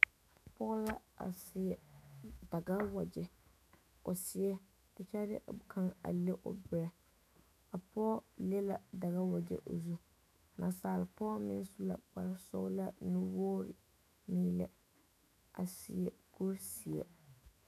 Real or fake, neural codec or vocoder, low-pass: fake; autoencoder, 48 kHz, 128 numbers a frame, DAC-VAE, trained on Japanese speech; 14.4 kHz